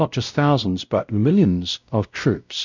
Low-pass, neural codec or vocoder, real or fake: 7.2 kHz; codec, 16 kHz, 0.5 kbps, X-Codec, WavLM features, trained on Multilingual LibriSpeech; fake